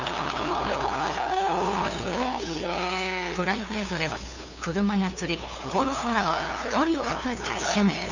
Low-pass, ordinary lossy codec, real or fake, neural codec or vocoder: 7.2 kHz; none; fake; codec, 16 kHz, 2 kbps, FunCodec, trained on LibriTTS, 25 frames a second